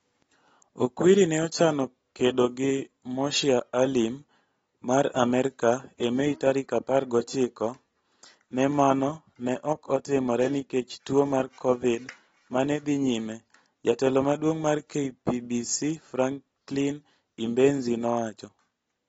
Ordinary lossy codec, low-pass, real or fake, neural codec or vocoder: AAC, 24 kbps; 19.8 kHz; real; none